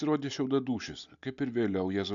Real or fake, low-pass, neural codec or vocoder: real; 7.2 kHz; none